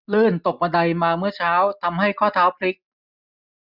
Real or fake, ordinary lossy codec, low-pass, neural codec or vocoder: real; none; 5.4 kHz; none